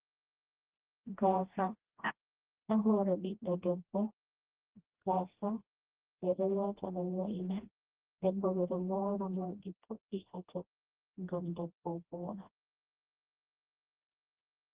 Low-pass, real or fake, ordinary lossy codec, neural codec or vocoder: 3.6 kHz; fake; Opus, 16 kbps; codec, 16 kHz, 1 kbps, FreqCodec, smaller model